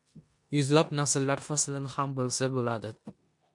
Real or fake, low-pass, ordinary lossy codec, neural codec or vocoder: fake; 10.8 kHz; MP3, 64 kbps; codec, 16 kHz in and 24 kHz out, 0.9 kbps, LongCat-Audio-Codec, four codebook decoder